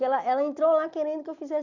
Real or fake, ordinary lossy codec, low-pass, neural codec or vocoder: real; none; 7.2 kHz; none